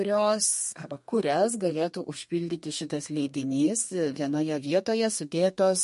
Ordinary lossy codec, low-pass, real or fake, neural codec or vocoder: MP3, 48 kbps; 14.4 kHz; fake; codec, 32 kHz, 1.9 kbps, SNAC